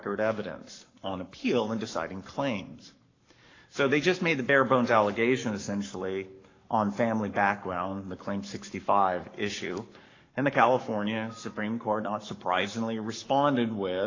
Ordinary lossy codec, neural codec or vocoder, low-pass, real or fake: AAC, 32 kbps; codec, 44.1 kHz, 7.8 kbps, Pupu-Codec; 7.2 kHz; fake